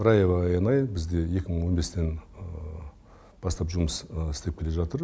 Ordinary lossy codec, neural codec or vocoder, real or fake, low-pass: none; none; real; none